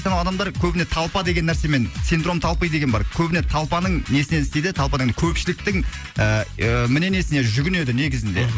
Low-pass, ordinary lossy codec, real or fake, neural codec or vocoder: none; none; real; none